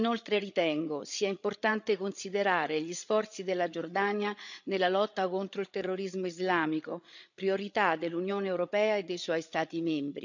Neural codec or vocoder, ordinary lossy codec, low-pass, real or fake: codec, 16 kHz, 8 kbps, FreqCodec, larger model; none; 7.2 kHz; fake